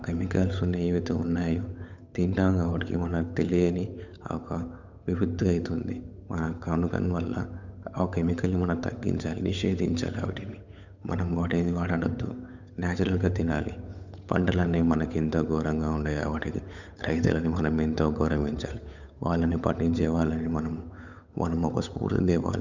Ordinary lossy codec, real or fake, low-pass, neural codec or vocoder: none; fake; 7.2 kHz; codec, 16 kHz, 8 kbps, FunCodec, trained on Chinese and English, 25 frames a second